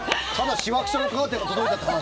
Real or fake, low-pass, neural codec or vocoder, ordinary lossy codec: real; none; none; none